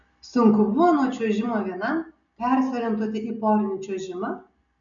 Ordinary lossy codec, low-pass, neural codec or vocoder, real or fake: MP3, 96 kbps; 7.2 kHz; none; real